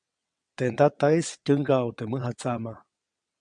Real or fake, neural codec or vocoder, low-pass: fake; vocoder, 22.05 kHz, 80 mel bands, WaveNeXt; 9.9 kHz